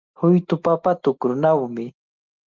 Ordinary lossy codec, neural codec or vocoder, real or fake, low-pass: Opus, 32 kbps; none; real; 7.2 kHz